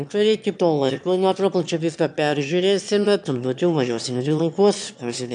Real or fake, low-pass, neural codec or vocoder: fake; 9.9 kHz; autoencoder, 22.05 kHz, a latent of 192 numbers a frame, VITS, trained on one speaker